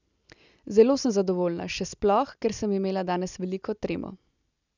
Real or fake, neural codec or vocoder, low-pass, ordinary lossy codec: real; none; 7.2 kHz; none